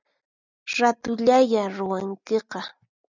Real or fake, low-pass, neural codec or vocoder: real; 7.2 kHz; none